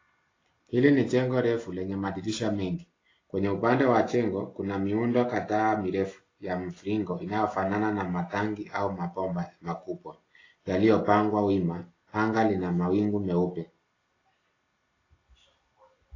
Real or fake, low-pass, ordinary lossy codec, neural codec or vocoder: real; 7.2 kHz; AAC, 32 kbps; none